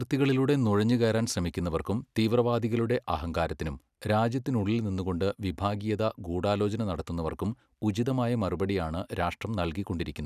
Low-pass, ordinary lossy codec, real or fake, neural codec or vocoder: 14.4 kHz; none; real; none